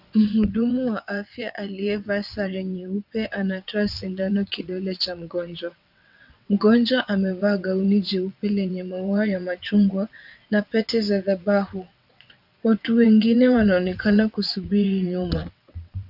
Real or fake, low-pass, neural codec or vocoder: fake; 5.4 kHz; vocoder, 22.05 kHz, 80 mel bands, WaveNeXt